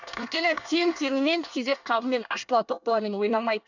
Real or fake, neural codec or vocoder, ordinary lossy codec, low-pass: fake; codec, 24 kHz, 1 kbps, SNAC; none; 7.2 kHz